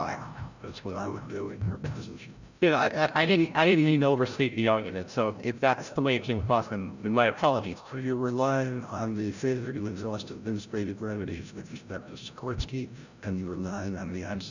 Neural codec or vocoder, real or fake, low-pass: codec, 16 kHz, 0.5 kbps, FreqCodec, larger model; fake; 7.2 kHz